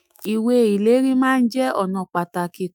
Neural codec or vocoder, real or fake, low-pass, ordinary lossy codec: autoencoder, 48 kHz, 128 numbers a frame, DAC-VAE, trained on Japanese speech; fake; none; none